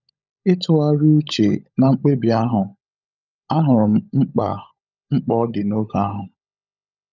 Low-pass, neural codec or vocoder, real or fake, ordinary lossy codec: 7.2 kHz; codec, 16 kHz, 16 kbps, FunCodec, trained on LibriTTS, 50 frames a second; fake; none